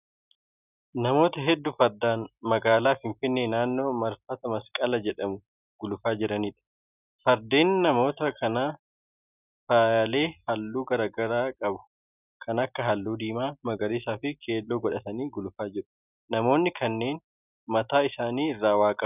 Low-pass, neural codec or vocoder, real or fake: 3.6 kHz; none; real